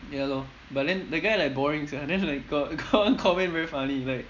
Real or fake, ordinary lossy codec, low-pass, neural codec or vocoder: real; none; 7.2 kHz; none